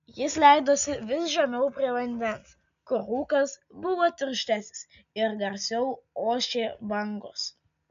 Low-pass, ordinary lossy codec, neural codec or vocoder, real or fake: 7.2 kHz; AAC, 96 kbps; none; real